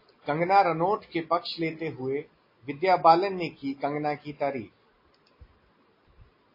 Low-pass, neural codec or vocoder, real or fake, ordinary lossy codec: 5.4 kHz; none; real; MP3, 24 kbps